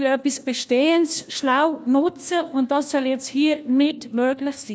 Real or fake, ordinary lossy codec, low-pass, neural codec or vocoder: fake; none; none; codec, 16 kHz, 1 kbps, FunCodec, trained on LibriTTS, 50 frames a second